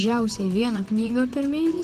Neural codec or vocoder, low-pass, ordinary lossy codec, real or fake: vocoder, 44.1 kHz, 128 mel bands, Pupu-Vocoder; 14.4 kHz; Opus, 16 kbps; fake